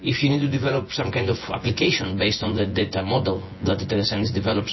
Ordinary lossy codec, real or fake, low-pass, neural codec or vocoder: MP3, 24 kbps; fake; 7.2 kHz; vocoder, 24 kHz, 100 mel bands, Vocos